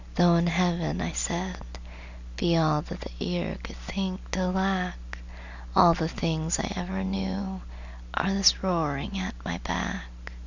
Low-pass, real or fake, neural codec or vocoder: 7.2 kHz; real; none